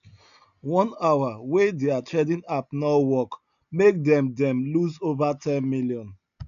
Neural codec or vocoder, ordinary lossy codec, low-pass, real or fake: none; none; 7.2 kHz; real